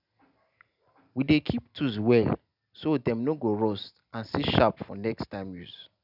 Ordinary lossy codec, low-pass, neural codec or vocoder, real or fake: none; 5.4 kHz; none; real